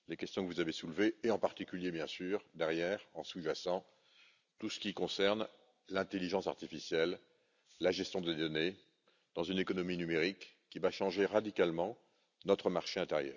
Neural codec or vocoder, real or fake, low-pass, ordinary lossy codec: none; real; 7.2 kHz; none